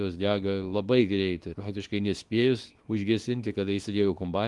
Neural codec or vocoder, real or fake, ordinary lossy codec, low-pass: codec, 24 kHz, 0.9 kbps, WavTokenizer, small release; fake; Opus, 24 kbps; 10.8 kHz